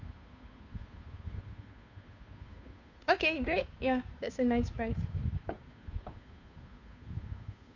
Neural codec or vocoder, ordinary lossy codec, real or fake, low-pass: codec, 16 kHz, 2 kbps, FunCodec, trained on Chinese and English, 25 frames a second; none; fake; 7.2 kHz